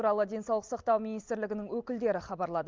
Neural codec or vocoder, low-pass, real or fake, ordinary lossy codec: none; 7.2 kHz; real; Opus, 32 kbps